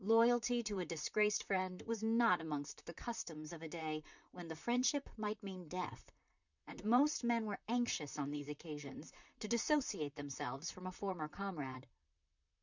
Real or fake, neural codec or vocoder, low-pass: fake; vocoder, 44.1 kHz, 128 mel bands, Pupu-Vocoder; 7.2 kHz